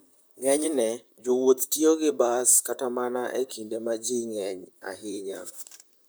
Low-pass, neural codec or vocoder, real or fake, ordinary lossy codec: none; vocoder, 44.1 kHz, 128 mel bands, Pupu-Vocoder; fake; none